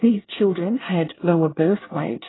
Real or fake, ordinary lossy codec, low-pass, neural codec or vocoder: fake; AAC, 16 kbps; 7.2 kHz; codec, 24 kHz, 1 kbps, SNAC